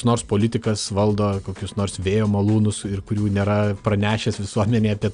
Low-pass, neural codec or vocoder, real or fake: 9.9 kHz; none; real